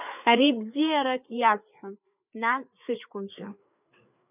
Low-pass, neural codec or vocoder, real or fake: 3.6 kHz; codec, 16 kHz, 4 kbps, FreqCodec, larger model; fake